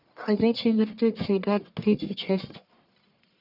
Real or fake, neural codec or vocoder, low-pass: fake; codec, 44.1 kHz, 1.7 kbps, Pupu-Codec; 5.4 kHz